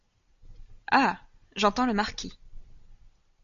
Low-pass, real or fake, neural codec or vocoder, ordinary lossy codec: 7.2 kHz; real; none; MP3, 48 kbps